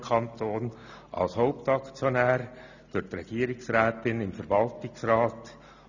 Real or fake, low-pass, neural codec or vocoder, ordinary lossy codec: real; 7.2 kHz; none; none